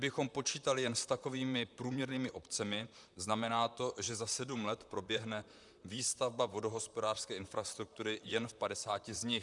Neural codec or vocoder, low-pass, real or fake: vocoder, 44.1 kHz, 128 mel bands, Pupu-Vocoder; 10.8 kHz; fake